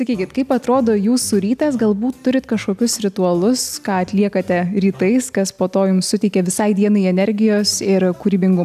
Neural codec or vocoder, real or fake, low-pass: none; real; 14.4 kHz